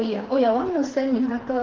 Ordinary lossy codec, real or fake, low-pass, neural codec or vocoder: Opus, 16 kbps; fake; 7.2 kHz; codec, 16 kHz, 4 kbps, FreqCodec, smaller model